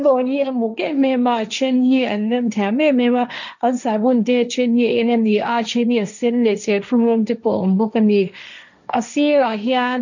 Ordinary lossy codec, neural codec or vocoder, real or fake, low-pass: none; codec, 16 kHz, 1.1 kbps, Voila-Tokenizer; fake; 7.2 kHz